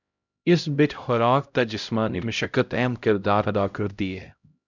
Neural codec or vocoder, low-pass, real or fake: codec, 16 kHz, 0.5 kbps, X-Codec, HuBERT features, trained on LibriSpeech; 7.2 kHz; fake